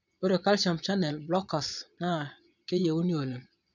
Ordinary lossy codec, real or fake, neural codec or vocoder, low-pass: none; fake; vocoder, 22.05 kHz, 80 mel bands, Vocos; 7.2 kHz